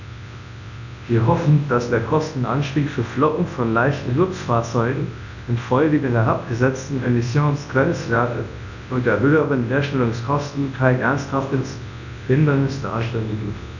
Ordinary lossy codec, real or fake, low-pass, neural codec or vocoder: none; fake; 7.2 kHz; codec, 24 kHz, 0.9 kbps, WavTokenizer, large speech release